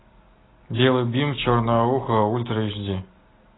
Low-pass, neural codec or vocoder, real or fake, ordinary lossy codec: 7.2 kHz; none; real; AAC, 16 kbps